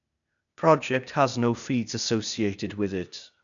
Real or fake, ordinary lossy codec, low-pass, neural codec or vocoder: fake; none; 7.2 kHz; codec, 16 kHz, 0.8 kbps, ZipCodec